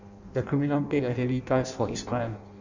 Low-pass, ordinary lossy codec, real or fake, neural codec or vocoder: 7.2 kHz; none; fake; codec, 16 kHz in and 24 kHz out, 0.6 kbps, FireRedTTS-2 codec